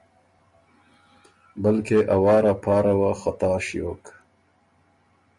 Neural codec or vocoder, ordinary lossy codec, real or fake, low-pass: none; MP3, 48 kbps; real; 10.8 kHz